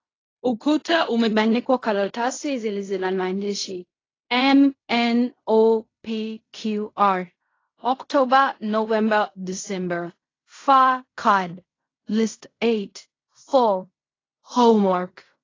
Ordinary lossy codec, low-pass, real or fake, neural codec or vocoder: AAC, 32 kbps; 7.2 kHz; fake; codec, 16 kHz in and 24 kHz out, 0.4 kbps, LongCat-Audio-Codec, fine tuned four codebook decoder